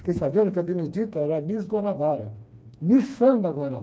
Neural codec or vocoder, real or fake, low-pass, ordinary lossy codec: codec, 16 kHz, 2 kbps, FreqCodec, smaller model; fake; none; none